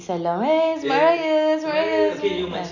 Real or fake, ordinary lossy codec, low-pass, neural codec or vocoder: real; none; 7.2 kHz; none